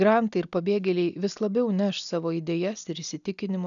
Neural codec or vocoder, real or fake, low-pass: none; real; 7.2 kHz